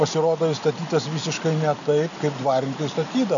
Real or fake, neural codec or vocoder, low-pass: real; none; 7.2 kHz